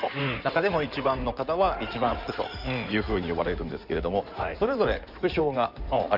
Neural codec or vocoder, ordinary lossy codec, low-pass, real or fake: codec, 16 kHz in and 24 kHz out, 2.2 kbps, FireRedTTS-2 codec; none; 5.4 kHz; fake